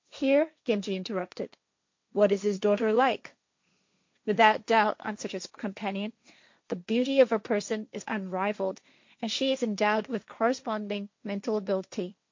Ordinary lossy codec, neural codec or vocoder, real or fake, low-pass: MP3, 48 kbps; codec, 16 kHz, 1.1 kbps, Voila-Tokenizer; fake; 7.2 kHz